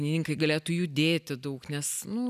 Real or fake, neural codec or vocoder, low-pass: real; none; 14.4 kHz